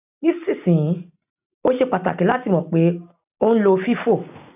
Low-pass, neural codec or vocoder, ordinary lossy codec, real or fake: 3.6 kHz; none; none; real